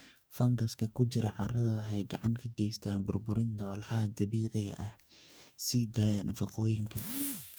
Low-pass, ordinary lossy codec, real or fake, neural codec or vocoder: none; none; fake; codec, 44.1 kHz, 2.6 kbps, DAC